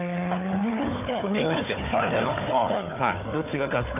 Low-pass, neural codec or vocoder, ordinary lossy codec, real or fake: 3.6 kHz; codec, 16 kHz, 4 kbps, FunCodec, trained on Chinese and English, 50 frames a second; none; fake